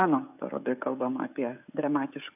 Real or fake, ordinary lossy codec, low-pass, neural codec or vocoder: fake; AAC, 32 kbps; 3.6 kHz; codec, 16 kHz, 16 kbps, FreqCodec, smaller model